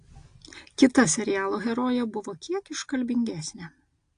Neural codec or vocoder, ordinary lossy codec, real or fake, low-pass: none; AAC, 48 kbps; real; 9.9 kHz